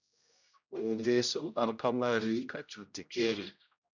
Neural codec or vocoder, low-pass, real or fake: codec, 16 kHz, 0.5 kbps, X-Codec, HuBERT features, trained on general audio; 7.2 kHz; fake